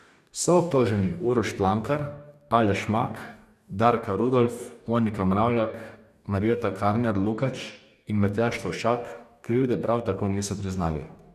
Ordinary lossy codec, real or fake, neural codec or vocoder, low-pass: none; fake; codec, 44.1 kHz, 2.6 kbps, DAC; 14.4 kHz